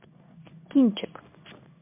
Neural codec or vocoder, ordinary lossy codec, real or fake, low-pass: codec, 16 kHz in and 24 kHz out, 1 kbps, XY-Tokenizer; MP3, 32 kbps; fake; 3.6 kHz